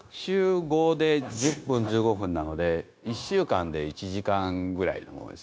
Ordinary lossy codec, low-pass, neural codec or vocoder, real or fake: none; none; codec, 16 kHz, 0.9 kbps, LongCat-Audio-Codec; fake